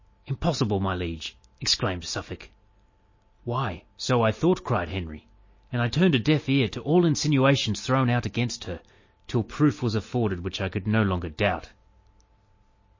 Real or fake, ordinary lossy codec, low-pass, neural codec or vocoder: real; MP3, 32 kbps; 7.2 kHz; none